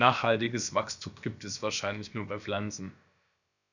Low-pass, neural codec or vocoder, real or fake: 7.2 kHz; codec, 16 kHz, about 1 kbps, DyCAST, with the encoder's durations; fake